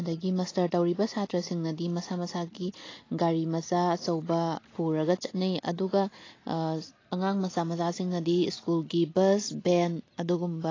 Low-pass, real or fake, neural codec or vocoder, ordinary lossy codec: 7.2 kHz; real; none; AAC, 32 kbps